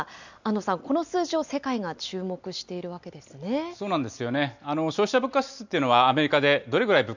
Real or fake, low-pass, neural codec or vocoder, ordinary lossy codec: real; 7.2 kHz; none; none